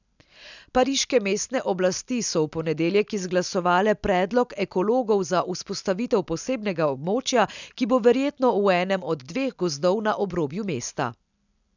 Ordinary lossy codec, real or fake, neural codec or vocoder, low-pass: none; real; none; 7.2 kHz